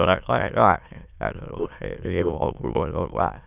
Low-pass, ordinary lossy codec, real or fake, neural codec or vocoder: 3.6 kHz; none; fake; autoencoder, 22.05 kHz, a latent of 192 numbers a frame, VITS, trained on many speakers